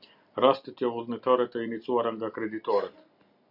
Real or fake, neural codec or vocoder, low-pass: real; none; 5.4 kHz